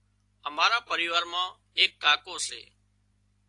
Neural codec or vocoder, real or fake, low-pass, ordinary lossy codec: none; real; 10.8 kHz; AAC, 48 kbps